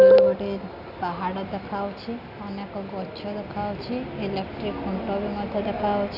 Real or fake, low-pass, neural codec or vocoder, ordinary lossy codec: real; 5.4 kHz; none; none